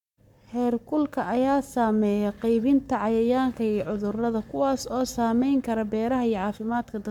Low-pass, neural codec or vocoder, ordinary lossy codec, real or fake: 19.8 kHz; codec, 44.1 kHz, 7.8 kbps, Pupu-Codec; none; fake